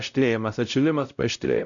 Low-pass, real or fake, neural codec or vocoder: 7.2 kHz; fake; codec, 16 kHz, 0.5 kbps, X-Codec, WavLM features, trained on Multilingual LibriSpeech